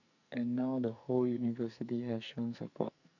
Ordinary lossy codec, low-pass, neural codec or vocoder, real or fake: none; 7.2 kHz; codec, 44.1 kHz, 2.6 kbps, SNAC; fake